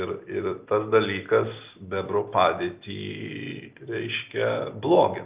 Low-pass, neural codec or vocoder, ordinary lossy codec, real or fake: 3.6 kHz; none; Opus, 24 kbps; real